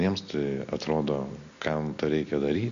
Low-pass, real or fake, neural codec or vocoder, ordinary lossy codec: 7.2 kHz; real; none; AAC, 64 kbps